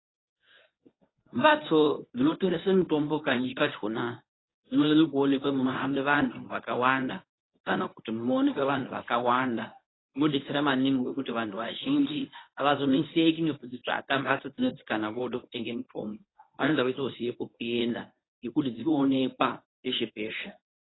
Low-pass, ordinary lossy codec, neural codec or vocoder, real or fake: 7.2 kHz; AAC, 16 kbps; codec, 24 kHz, 0.9 kbps, WavTokenizer, medium speech release version 1; fake